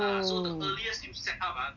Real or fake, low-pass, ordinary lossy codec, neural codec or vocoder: real; 7.2 kHz; none; none